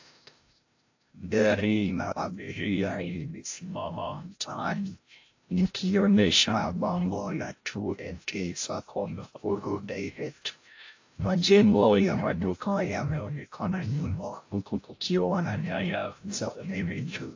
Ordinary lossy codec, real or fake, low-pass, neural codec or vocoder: AAC, 48 kbps; fake; 7.2 kHz; codec, 16 kHz, 0.5 kbps, FreqCodec, larger model